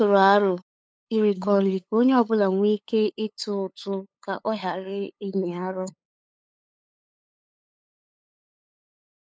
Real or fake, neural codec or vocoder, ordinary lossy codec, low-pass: fake; codec, 16 kHz, 8 kbps, FunCodec, trained on LibriTTS, 25 frames a second; none; none